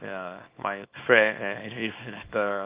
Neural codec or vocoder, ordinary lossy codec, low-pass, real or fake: codec, 24 kHz, 0.9 kbps, WavTokenizer, small release; Opus, 24 kbps; 3.6 kHz; fake